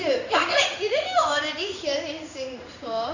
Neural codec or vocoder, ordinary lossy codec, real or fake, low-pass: codec, 16 kHz in and 24 kHz out, 1 kbps, XY-Tokenizer; none; fake; 7.2 kHz